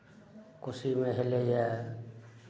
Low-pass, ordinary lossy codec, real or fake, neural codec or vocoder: none; none; real; none